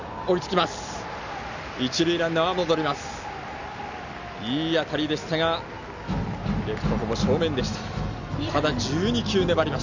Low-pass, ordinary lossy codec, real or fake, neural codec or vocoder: 7.2 kHz; none; real; none